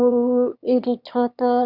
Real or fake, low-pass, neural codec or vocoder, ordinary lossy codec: fake; 5.4 kHz; autoencoder, 22.05 kHz, a latent of 192 numbers a frame, VITS, trained on one speaker; Opus, 64 kbps